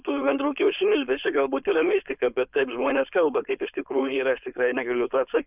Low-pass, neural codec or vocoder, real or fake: 3.6 kHz; codec, 16 kHz, 4.8 kbps, FACodec; fake